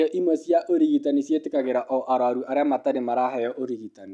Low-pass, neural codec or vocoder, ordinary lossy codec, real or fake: none; none; none; real